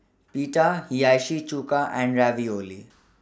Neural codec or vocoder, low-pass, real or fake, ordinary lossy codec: none; none; real; none